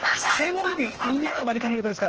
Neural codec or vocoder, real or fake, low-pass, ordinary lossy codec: codec, 16 kHz, 0.8 kbps, ZipCodec; fake; 7.2 kHz; Opus, 16 kbps